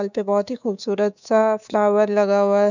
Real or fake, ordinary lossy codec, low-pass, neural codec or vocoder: fake; none; 7.2 kHz; codec, 24 kHz, 3.1 kbps, DualCodec